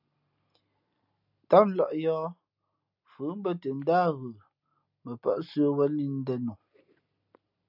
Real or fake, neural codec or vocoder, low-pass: real; none; 5.4 kHz